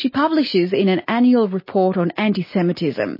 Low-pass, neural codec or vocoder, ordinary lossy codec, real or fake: 5.4 kHz; none; MP3, 24 kbps; real